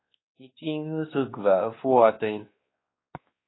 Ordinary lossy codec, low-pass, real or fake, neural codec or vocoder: AAC, 16 kbps; 7.2 kHz; fake; codec, 16 kHz, 2 kbps, X-Codec, WavLM features, trained on Multilingual LibriSpeech